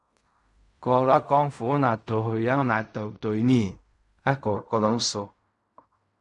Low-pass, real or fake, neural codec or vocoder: 10.8 kHz; fake; codec, 16 kHz in and 24 kHz out, 0.4 kbps, LongCat-Audio-Codec, fine tuned four codebook decoder